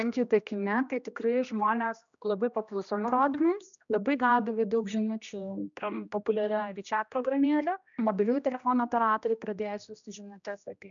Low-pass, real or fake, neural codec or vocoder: 7.2 kHz; fake; codec, 16 kHz, 1 kbps, X-Codec, HuBERT features, trained on general audio